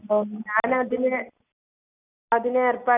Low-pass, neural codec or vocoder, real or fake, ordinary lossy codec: 3.6 kHz; none; real; none